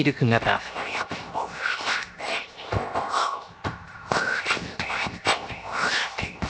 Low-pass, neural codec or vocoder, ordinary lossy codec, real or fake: none; codec, 16 kHz, 0.7 kbps, FocalCodec; none; fake